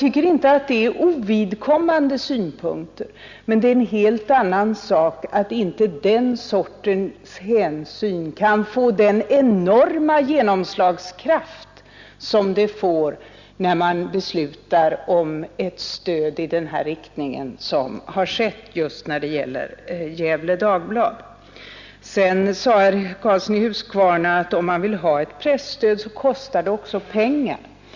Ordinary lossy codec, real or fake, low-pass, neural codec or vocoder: Opus, 64 kbps; real; 7.2 kHz; none